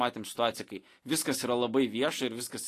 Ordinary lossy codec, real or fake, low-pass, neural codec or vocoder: AAC, 48 kbps; real; 14.4 kHz; none